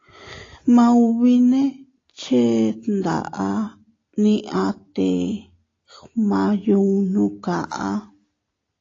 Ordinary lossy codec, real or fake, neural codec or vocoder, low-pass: AAC, 32 kbps; real; none; 7.2 kHz